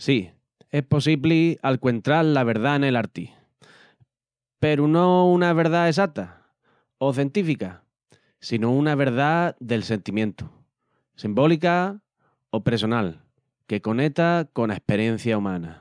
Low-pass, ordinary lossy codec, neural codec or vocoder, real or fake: 9.9 kHz; none; none; real